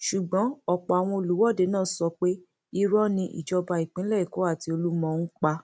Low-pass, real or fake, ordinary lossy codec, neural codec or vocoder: none; real; none; none